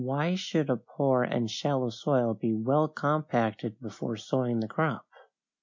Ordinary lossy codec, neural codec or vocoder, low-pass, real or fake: MP3, 64 kbps; none; 7.2 kHz; real